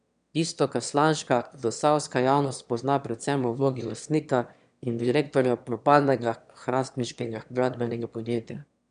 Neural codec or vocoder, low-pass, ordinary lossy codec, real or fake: autoencoder, 22.05 kHz, a latent of 192 numbers a frame, VITS, trained on one speaker; 9.9 kHz; none; fake